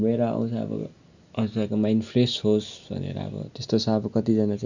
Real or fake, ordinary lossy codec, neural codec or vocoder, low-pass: real; none; none; 7.2 kHz